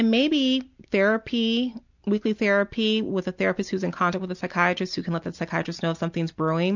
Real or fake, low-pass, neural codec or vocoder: real; 7.2 kHz; none